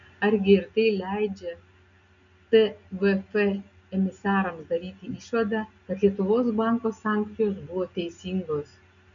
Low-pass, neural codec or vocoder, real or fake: 7.2 kHz; none; real